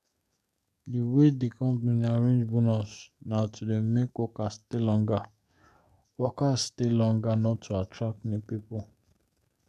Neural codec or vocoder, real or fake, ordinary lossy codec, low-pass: codec, 44.1 kHz, 7.8 kbps, DAC; fake; none; 14.4 kHz